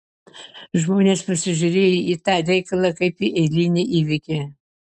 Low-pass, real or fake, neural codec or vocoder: 10.8 kHz; real; none